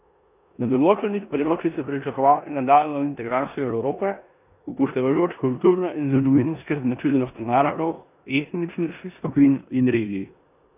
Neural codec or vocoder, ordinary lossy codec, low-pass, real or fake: codec, 16 kHz in and 24 kHz out, 0.9 kbps, LongCat-Audio-Codec, four codebook decoder; none; 3.6 kHz; fake